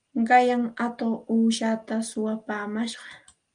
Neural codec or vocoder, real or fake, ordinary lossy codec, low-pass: none; real; Opus, 32 kbps; 9.9 kHz